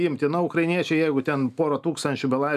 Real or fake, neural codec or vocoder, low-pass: real; none; 14.4 kHz